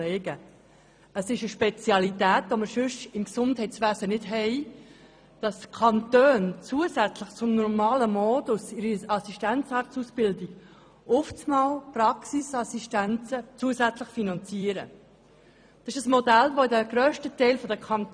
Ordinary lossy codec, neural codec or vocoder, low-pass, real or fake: none; vocoder, 44.1 kHz, 128 mel bands every 256 samples, BigVGAN v2; 9.9 kHz; fake